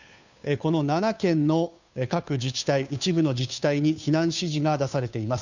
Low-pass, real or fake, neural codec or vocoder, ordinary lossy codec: 7.2 kHz; fake; codec, 16 kHz, 2 kbps, FunCodec, trained on Chinese and English, 25 frames a second; none